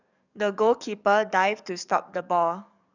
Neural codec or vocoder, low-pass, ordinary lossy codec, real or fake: codec, 44.1 kHz, 7.8 kbps, DAC; 7.2 kHz; none; fake